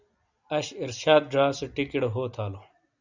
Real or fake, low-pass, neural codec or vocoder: real; 7.2 kHz; none